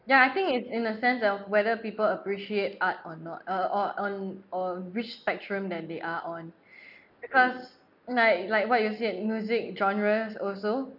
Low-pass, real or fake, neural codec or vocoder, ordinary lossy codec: 5.4 kHz; real; none; none